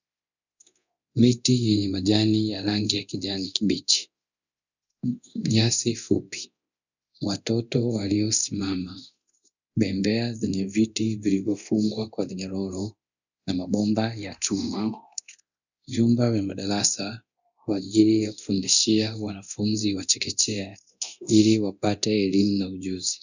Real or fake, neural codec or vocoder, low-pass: fake; codec, 24 kHz, 0.9 kbps, DualCodec; 7.2 kHz